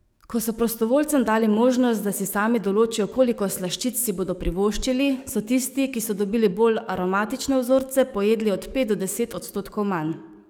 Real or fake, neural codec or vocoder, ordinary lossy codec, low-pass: fake; codec, 44.1 kHz, 7.8 kbps, DAC; none; none